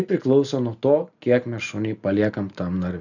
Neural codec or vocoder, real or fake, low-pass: none; real; 7.2 kHz